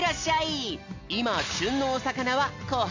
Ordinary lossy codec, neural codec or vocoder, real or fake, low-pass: AAC, 48 kbps; none; real; 7.2 kHz